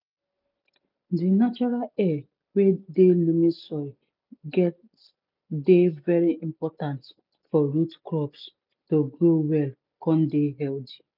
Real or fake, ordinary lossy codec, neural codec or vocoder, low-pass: real; none; none; 5.4 kHz